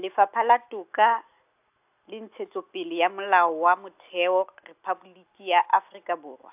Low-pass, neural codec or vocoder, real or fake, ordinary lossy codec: 3.6 kHz; none; real; AAC, 32 kbps